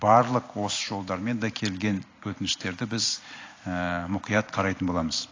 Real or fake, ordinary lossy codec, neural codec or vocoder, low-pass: real; AAC, 32 kbps; none; 7.2 kHz